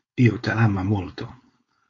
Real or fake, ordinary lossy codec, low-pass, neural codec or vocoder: fake; AAC, 32 kbps; 7.2 kHz; codec, 16 kHz, 4.8 kbps, FACodec